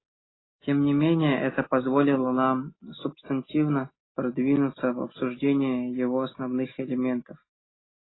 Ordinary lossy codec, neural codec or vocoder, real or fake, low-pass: AAC, 16 kbps; none; real; 7.2 kHz